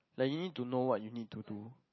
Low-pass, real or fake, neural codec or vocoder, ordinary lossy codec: 7.2 kHz; real; none; MP3, 24 kbps